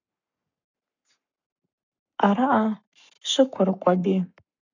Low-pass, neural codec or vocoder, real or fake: 7.2 kHz; codec, 44.1 kHz, 7.8 kbps, Pupu-Codec; fake